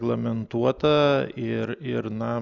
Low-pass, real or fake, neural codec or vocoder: 7.2 kHz; real; none